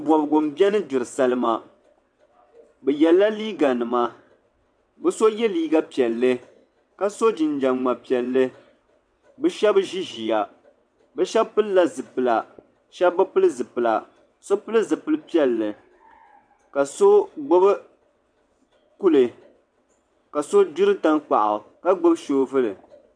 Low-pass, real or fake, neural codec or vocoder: 9.9 kHz; fake; vocoder, 22.05 kHz, 80 mel bands, WaveNeXt